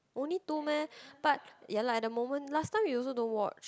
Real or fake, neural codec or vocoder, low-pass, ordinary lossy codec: real; none; none; none